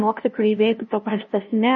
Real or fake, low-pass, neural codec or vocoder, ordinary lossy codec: fake; 7.2 kHz; codec, 16 kHz, 0.5 kbps, FunCodec, trained on LibriTTS, 25 frames a second; MP3, 32 kbps